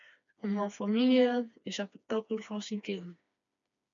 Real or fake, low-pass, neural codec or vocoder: fake; 7.2 kHz; codec, 16 kHz, 2 kbps, FreqCodec, smaller model